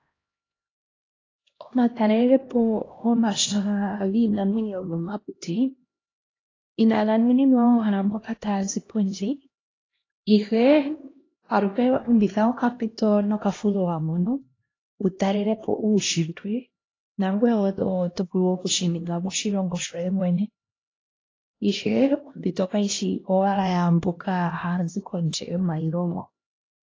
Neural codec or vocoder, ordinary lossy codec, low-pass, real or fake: codec, 16 kHz, 1 kbps, X-Codec, HuBERT features, trained on LibriSpeech; AAC, 32 kbps; 7.2 kHz; fake